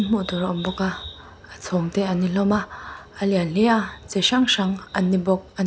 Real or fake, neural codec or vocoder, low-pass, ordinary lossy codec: real; none; none; none